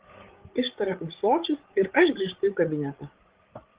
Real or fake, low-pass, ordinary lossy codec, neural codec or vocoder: fake; 3.6 kHz; Opus, 16 kbps; codec, 16 kHz, 16 kbps, FreqCodec, larger model